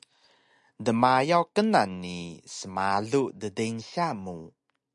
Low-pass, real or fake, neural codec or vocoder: 10.8 kHz; real; none